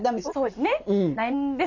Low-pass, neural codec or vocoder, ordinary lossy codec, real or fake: 7.2 kHz; none; none; real